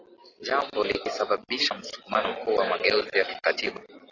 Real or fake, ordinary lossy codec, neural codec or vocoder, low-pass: real; AAC, 32 kbps; none; 7.2 kHz